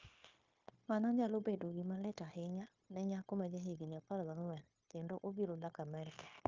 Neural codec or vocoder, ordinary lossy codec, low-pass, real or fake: codec, 16 kHz, 0.9 kbps, LongCat-Audio-Codec; Opus, 24 kbps; 7.2 kHz; fake